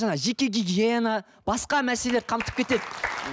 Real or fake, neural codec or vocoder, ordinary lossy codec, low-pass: real; none; none; none